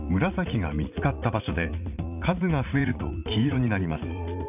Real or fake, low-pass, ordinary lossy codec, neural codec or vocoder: fake; 3.6 kHz; none; vocoder, 44.1 kHz, 80 mel bands, Vocos